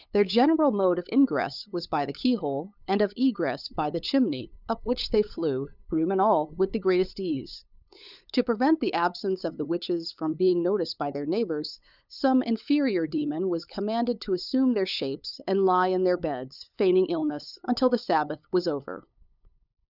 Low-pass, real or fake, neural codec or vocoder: 5.4 kHz; fake; codec, 16 kHz, 8 kbps, FunCodec, trained on LibriTTS, 25 frames a second